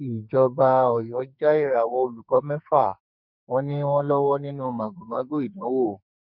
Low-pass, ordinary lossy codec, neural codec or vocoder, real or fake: 5.4 kHz; none; codec, 44.1 kHz, 2.6 kbps, SNAC; fake